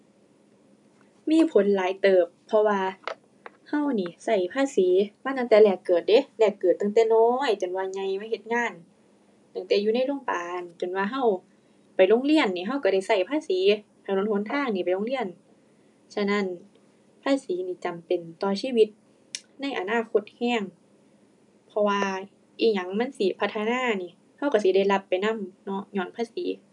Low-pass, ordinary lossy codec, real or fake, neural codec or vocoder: 10.8 kHz; none; real; none